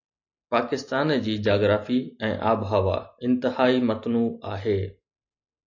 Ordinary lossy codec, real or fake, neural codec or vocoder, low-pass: AAC, 48 kbps; real; none; 7.2 kHz